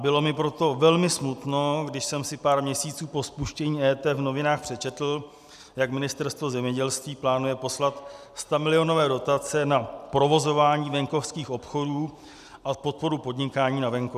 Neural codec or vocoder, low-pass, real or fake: none; 14.4 kHz; real